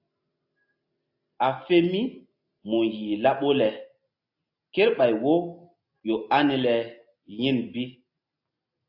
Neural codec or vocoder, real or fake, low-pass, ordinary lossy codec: none; real; 5.4 kHz; AAC, 48 kbps